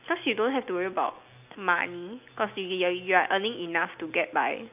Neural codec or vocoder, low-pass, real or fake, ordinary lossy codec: none; 3.6 kHz; real; none